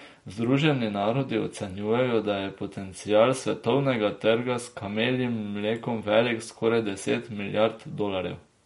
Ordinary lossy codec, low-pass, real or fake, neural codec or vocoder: MP3, 48 kbps; 19.8 kHz; real; none